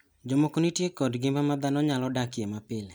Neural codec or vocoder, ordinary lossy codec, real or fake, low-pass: none; none; real; none